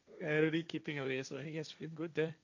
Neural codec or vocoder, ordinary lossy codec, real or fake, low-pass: codec, 16 kHz, 1.1 kbps, Voila-Tokenizer; none; fake; 7.2 kHz